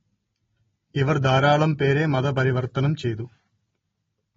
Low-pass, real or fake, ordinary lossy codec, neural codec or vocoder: 7.2 kHz; real; AAC, 24 kbps; none